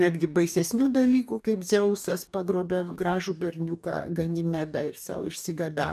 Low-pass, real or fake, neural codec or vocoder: 14.4 kHz; fake; codec, 44.1 kHz, 2.6 kbps, DAC